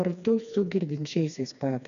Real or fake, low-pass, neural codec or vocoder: fake; 7.2 kHz; codec, 16 kHz, 2 kbps, FreqCodec, smaller model